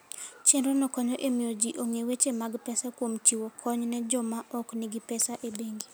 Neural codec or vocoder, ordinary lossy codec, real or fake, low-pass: none; none; real; none